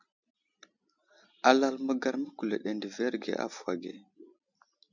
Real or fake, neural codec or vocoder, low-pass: real; none; 7.2 kHz